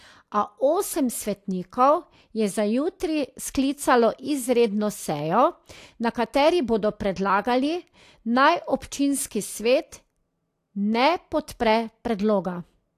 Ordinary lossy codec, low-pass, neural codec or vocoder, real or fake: AAC, 64 kbps; 14.4 kHz; none; real